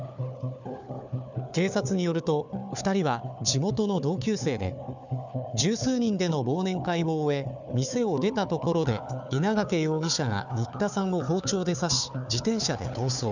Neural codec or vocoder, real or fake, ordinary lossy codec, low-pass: codec, 16 kHz, 4 kbps, FunCodec, trained on Chinese and English, 50 frames a second; fake; none; 7.2 kHz